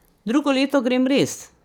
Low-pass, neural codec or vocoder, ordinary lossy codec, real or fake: 19.8 kHz; codec, 44.1 kHz, 7.8 kbps, DAC; none; fake